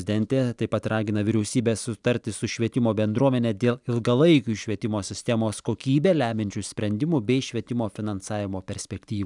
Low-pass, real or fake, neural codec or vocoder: 10.8 kHz; real; none